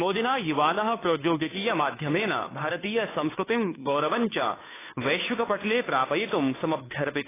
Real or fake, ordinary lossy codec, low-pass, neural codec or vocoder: fake; AAC, 16 kbps; 3.6 kHz; codec, 16 kHz, 2 kbps, FunCodec, trained on Chinese and English, 25 frames a second